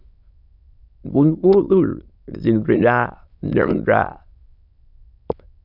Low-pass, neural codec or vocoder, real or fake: 5.4 kHz; autoencoder, 22.05 kHz, a latent of 192 numbers a frame, VITS, trained on many speakers; fake